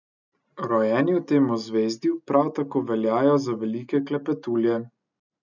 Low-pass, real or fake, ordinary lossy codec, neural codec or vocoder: 7.2 kHz; real; none; none